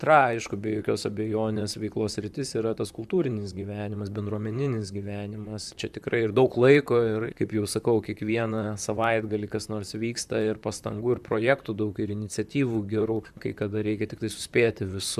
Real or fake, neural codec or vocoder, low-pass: fake; vocoder, 44.1 kHz, 128 mel bands, Pupu-Vocoder; 14.4 kHz